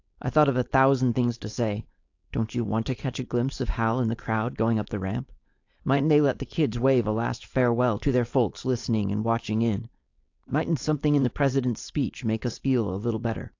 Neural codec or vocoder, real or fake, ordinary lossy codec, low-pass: codec, 16 kHz, 4.8 kbps, FACodec; fake; AAC, 48 kbps; 7.2 kHz